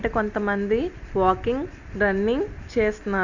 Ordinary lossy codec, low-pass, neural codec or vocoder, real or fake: none; 7.2 kHz; none; real